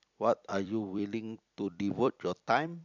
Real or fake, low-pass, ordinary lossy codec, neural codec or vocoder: fake; 7.2 kHz; none; vocoder, 44.1 kHz, 128 mel bands every 256 samples, BigVGAN v2